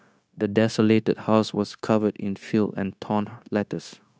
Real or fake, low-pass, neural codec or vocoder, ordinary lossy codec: fake; none; codec, 16 kHz, 0.9 kbps, LongCat-Audio-Codec; none